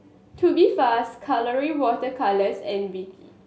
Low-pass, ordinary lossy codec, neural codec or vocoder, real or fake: none; none; none; real